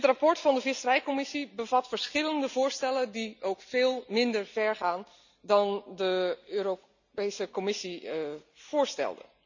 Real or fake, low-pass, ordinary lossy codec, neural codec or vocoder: real; 7.2 kHz; none; none